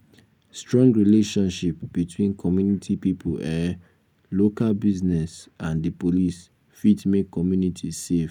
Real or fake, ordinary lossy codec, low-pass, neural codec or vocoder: real; none; 19.8 kHz; none